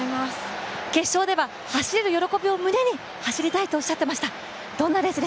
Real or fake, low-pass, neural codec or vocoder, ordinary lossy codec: real; none; none; none